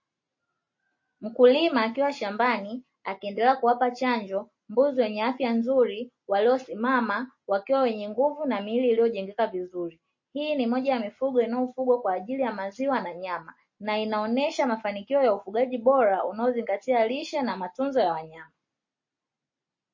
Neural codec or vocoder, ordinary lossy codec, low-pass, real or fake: none; MP3, 32 kbps; 7.2 kHz; real